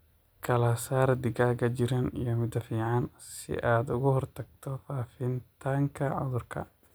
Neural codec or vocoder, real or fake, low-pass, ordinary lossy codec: none; real; none; none